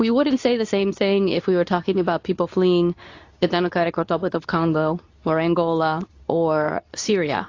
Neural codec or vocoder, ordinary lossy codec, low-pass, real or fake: codec, 24 kHz, 0.9 kbps, WavTokenizer, medium speech release version 2; AAC, 48 kbps; 7.2 kHz; fake